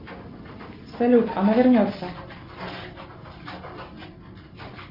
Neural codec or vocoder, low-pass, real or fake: none; 5.4 kHz; real